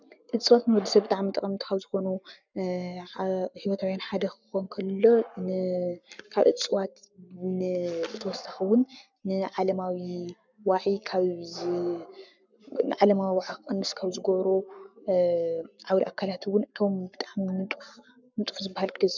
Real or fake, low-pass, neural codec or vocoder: fake; 7.2 kHz; codec, 44.1 kHz, 7.8 kbps, Pupu-Codec